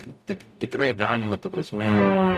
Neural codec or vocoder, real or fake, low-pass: codec, 44.1 kHz, 0.9 kbps, DAC; fake; 14.4 kHz